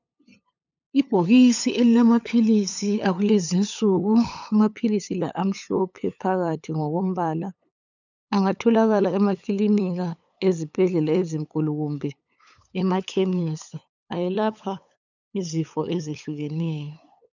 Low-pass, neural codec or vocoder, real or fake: 7.2 kHz; codec, 16 kHz, 8 kbps, FunCodec, trained on LibriTTS, 25 frames a second; fake